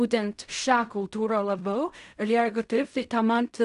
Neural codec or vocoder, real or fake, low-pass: codec, 16 kHz in and 24 kHz out, 0.4 kbps, LongCat-Audio-Codec, fine tuned four codebook decoder; fake; 10.8 kHz